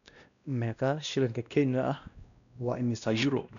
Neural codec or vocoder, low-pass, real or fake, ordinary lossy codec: codec, 16 kHz, 1 kbps, X-Codec, WavLM features, trained on Multilingual LibriSpeech; 7.2 kHz; fake; none